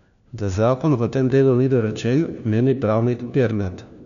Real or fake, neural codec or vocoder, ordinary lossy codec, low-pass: fake; codec, 16 kHz, 1 kbps, FunCodec, trained on LibriTTS, 50 frames a second; none; 7.2 kHz